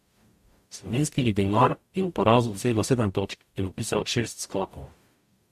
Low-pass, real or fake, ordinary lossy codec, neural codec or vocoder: 14.4 kHz; fake; MP3, 64 kbps; codec, 44.1 kHz, 0.9 kbps, DAC